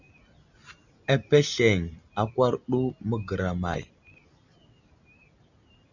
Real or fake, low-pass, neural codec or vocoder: real; 7.2 kHz; none